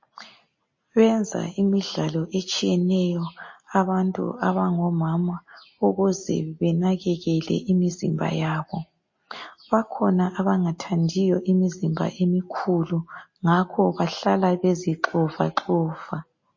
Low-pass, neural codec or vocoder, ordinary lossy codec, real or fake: 7.2 kHz; none; MP3, 32 kbps; real